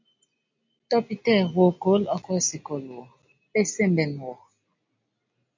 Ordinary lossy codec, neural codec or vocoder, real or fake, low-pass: MP3, 64 kbps; none; real; 7.2 kHz